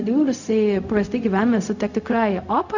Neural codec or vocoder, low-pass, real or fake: codec, 16 kHz, 0.4 kbps, LongCat-Audio-Codec; 7.2 kHz; fake